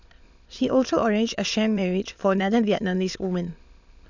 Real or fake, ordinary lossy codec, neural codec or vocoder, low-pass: fake; none; autoencoder, 22.05 kHz, a latent of 192 numbers a frame, VITS, trained on many speakers; 7.2 kHz